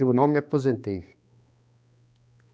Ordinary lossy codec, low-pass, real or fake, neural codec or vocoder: none; none; fake; codec, 16 kHz, 2 kbps, X-Codec, HuBERT features, trained on balanced general audio